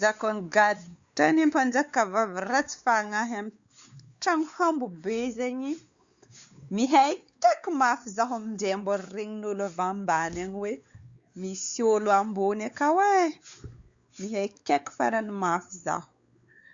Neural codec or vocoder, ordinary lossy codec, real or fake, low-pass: codec, 16 kHz, 4 kbps, X-Codec, WavLM features, trained on Multilingual LibriSpeech; Opus, 64 kbps; fake; 7.2 kHz